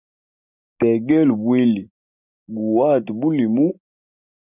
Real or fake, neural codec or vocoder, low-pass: real; none; 3.6 kHz